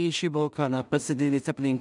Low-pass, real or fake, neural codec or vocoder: 10.8 kHz; fake; codec, 16 kHz in and 24 kHz out, 0.4 kbps, LongCat-Audio-Codec, two codebook decoder